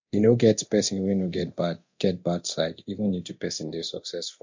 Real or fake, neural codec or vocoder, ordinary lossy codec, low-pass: fake; codec, 24 kHz, 0.9 kbps, DualCodec; MP3, 48 kbps; 7.2 kHz